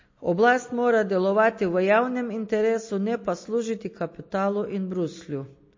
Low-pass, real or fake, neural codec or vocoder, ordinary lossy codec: 7.2 kHz; real; none; MP3, 32 kbps